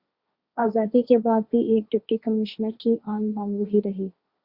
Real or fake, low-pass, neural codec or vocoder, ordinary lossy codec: fake; 5.4 kHz; codec, 16 kHz, 1.1 kbps, Voila-Tokenizer; Opus, 64 kbps